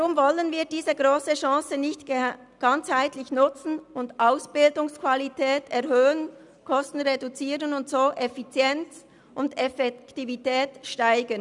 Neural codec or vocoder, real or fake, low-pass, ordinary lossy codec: none; real; 10.8 kHz; none